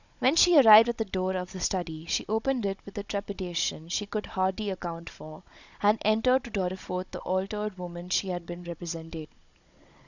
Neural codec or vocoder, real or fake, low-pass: codec, 16 kHz, 16 kbps, FunCodec, trained on Chinese and English, 50 frames a second; fake; 7.2 kHz